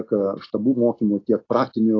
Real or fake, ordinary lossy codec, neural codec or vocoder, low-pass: real; AAC, 32 kbps; none; 7.2 kHz